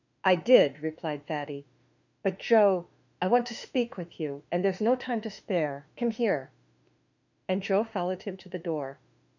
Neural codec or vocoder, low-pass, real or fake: autoencoder, 48 kHz, 32 numbers a frame, DAC-VAE, trained on Japanese speech; 7.2 kHz; fake